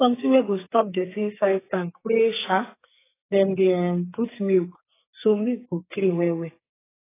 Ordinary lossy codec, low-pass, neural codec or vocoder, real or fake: AAC, 16 kbps; 3.6 kHz; codec, 44.1 kHz, 2.6 kbps, SNAC; fake